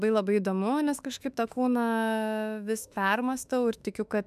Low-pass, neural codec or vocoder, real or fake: 14.4 kHz; autoencoder, 48 kHz, 32 numbers a frame, DAC-VAE, trained on Japanese speech; fake